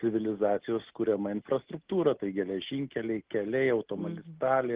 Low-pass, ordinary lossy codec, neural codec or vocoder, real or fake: 3.6 kHz; Opus, 16 kbps; none; real